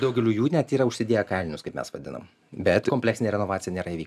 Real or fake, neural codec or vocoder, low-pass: real; none; 14.4 kHz